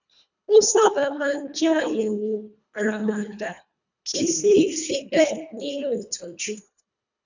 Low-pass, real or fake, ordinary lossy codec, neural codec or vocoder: 7.2 kHz; fake; none; codec, 24 kHz, 1.5 kbps, HILCodec